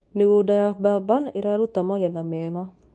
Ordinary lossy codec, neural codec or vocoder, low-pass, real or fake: none; codec, 24 kHz, 0.9 kbps, WavTokenizer, medium speech release version 2; none; fake